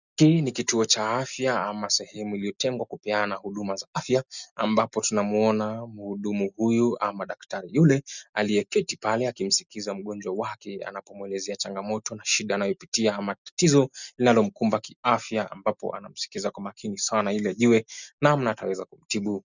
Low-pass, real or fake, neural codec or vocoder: 7.2 kHz; real; none